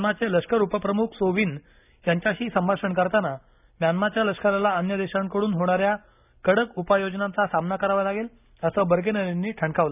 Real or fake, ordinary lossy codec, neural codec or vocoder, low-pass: real; none; none; 3.6 kHz